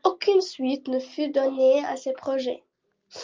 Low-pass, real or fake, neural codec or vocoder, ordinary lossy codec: 7.2 kHz; real; none; Opus, 32 kbps